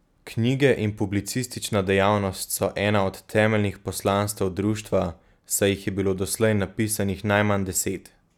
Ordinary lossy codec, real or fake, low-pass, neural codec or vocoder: none; real; 19.8 kHz; none